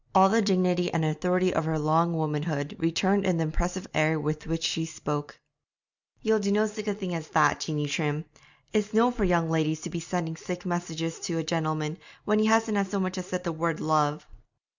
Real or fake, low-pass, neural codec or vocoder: real; 7.2 kHz; none